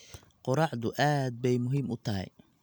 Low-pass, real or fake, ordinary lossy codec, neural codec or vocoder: none; real; none; none